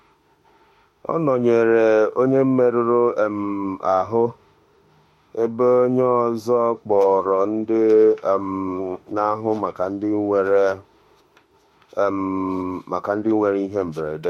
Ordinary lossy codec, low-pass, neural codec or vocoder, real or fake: MP3, 64 kbps; 19.8 kHz; autoencoder, 48 kHz, 32 numbers a frame, DAC-VAE, trained on Japanese speech; fake